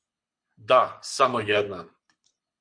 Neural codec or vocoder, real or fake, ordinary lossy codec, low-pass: codec, 24 kHz, 6 kbps, HILCodec; fake; MP3, 48 kbps; 9.9 kHz